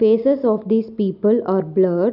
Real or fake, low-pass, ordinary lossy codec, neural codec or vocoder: real; 5.4 kHz; none; none